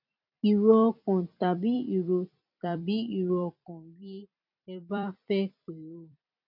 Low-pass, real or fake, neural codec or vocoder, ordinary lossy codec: 5.4 kHz; fake; vocoder, 44.1 kHz, 128 mel bands every 256 samples, BigVGAN v2; none